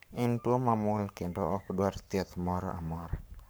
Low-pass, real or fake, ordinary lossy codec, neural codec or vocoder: none; fake; none; codec, 44.1 kHz, 7.8 kbps, Pupu-Codec